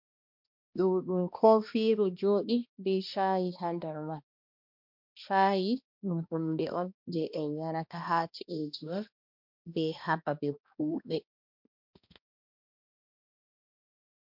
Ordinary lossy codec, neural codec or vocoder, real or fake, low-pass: MP3, 48 kbps; codec, 16 kHz, 1 kbps, X-Codec, HuBERT features, trained on balanced general audio; fake; 5.4 kHz